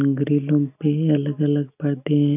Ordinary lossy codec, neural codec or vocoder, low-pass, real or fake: none; none; 3.6 kHz; real